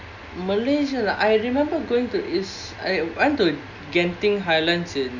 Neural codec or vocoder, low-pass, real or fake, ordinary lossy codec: none; 7.2 kHz; real; none